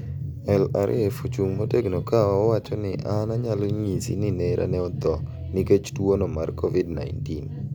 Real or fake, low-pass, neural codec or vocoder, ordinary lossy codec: real; none; none; none